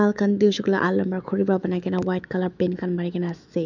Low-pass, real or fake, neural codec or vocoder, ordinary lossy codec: 7.2 kHz; fake; autoencoder, 48 kHz, 128 numbers a frame, DAC-VAE, trained on Japanese speech; none